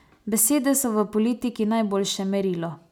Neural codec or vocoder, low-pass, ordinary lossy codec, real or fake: none; none; none; real